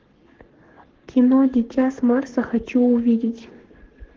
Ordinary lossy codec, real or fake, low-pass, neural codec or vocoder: Opus, 16 kbps; fake; 7.2 kHz; codec, 44.1 kHz, 7.8 kbps, Pupu-Codec